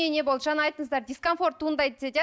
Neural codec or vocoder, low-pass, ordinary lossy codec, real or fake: none; none; none; real